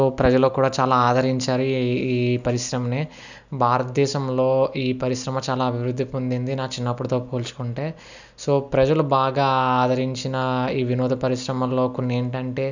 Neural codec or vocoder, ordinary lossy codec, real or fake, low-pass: none; none; real; 7.2 kHz